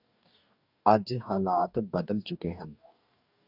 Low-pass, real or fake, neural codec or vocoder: 5.4 kHz; fake; codec, 44.1 kHz, 2.6 kbps, DAC